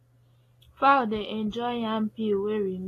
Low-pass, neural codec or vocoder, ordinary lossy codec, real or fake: 14.4 kHz; none; AAC, 48 kbps; real